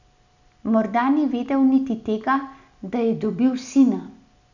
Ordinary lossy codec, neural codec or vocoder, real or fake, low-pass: none; none; real; 7.2 kHz